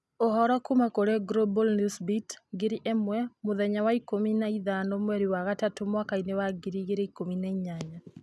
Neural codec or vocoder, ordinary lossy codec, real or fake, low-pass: none; none; real; none